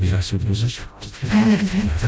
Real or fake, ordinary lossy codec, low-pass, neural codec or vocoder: fake; none; none; codec, 16 kHz, 0.5 kbps, FreqCodec, smaller model